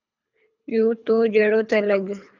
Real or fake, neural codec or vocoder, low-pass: fake; codec, 24 kHz, 3 kbps, HILCodec; 7.2 kHz